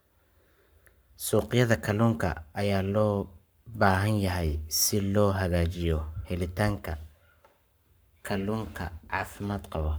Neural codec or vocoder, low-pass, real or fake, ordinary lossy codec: codec, 44.1 kHz, 7.8 kbps, Pupu-Codec; none; fake; none